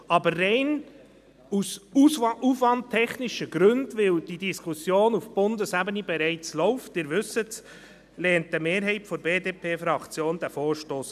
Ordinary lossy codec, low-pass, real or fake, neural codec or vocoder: none; 14.4 kHz; real; none